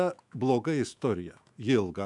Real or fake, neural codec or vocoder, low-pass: fake; autoencoder, 48 kHz, 128 numbers a frame, DAC-VAE, trained on Japanese speech; 10.8 kHz